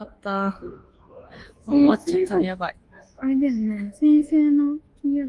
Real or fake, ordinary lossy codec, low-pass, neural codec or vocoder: fake; Opus, 24 kbps; 10.8 kHz; codec, 24 kHz, 1.2 kbps, DualCodec